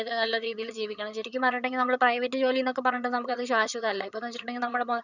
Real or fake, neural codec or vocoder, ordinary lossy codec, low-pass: fake; vocoder, 22.05 kHz, 80 mel bands, HiFi-GAN; none; 7.2 kHz